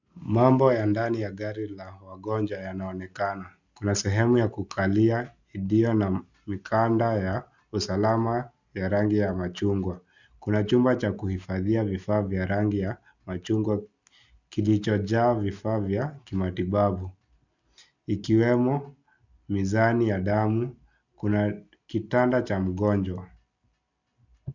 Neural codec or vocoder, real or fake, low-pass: none; real; 7.2 kHz